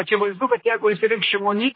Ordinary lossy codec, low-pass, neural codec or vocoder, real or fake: MP3, 24 kbps; 5.4 kHz; codec, 16 kHz, 1 kbps, X-Codec, HuBERT features, trained on general audio; fake